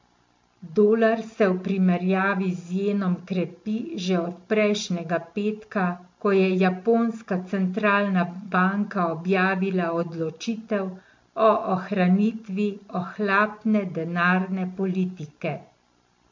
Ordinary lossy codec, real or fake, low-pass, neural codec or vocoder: MP3, 48 kbps; real; 7.2 kHz; none